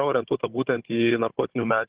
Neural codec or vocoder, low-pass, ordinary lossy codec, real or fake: codec, 16 kHz, 16 kbps, FunCodec, trained on Chinese and English, 50 frames a second; 3.6 kHz; Opus, 16 kbps; fake